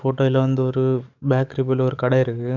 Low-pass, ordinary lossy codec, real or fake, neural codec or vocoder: 7.2 kHz; none; fake; codec, 44.1 kHz, 7.8 kbps, DAC